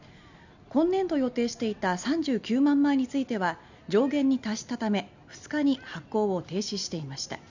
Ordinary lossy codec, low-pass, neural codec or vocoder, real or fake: none; 7.2 kHz; none; real